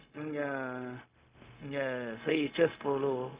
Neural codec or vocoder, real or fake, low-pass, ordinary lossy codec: codec, 16 kHz, 0.4 kbps, LongCat-Audio-Codec; fake; 3.6 kHz; Opus, 64 kbps